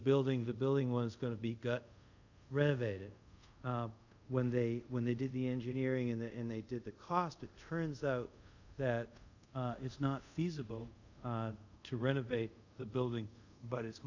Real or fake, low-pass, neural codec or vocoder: fake; 7.2 kHz; codec, 24 kHz, 0.5 kbps, DualCodec